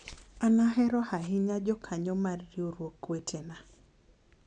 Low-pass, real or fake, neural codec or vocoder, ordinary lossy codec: 10.8 kHz; real; none; none